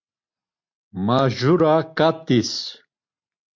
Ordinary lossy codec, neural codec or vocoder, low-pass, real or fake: MP3, 48 kbps; none; 7.2 kHz; real